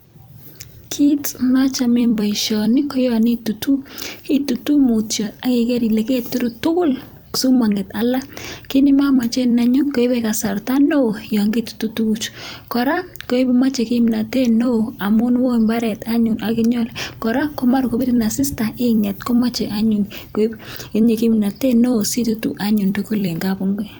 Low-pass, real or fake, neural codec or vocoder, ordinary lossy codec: none; fake; vocoder, 44.1 kHz, 128 mel bands every 256 samples, BigVGAN v2; none